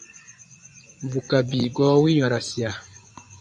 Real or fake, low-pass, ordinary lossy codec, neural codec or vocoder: fake; 10.8 kHz; MP3, 96 kbps; vocoder, 24 kHz, 100 mel bands, Vocos